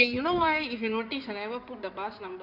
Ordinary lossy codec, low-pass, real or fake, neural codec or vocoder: none; 5.4 kHz; fake; codec, 16 kHz in and 24 kHz out, 2.2 kbps, FireRedTTS-2 codec